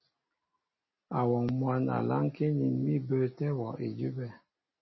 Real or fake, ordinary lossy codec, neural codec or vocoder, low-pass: real; MP3, 24 kbps; none; 7.2 kHz